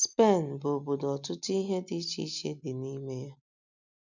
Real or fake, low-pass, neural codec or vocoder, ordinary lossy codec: real; 7.2 kHz; none; none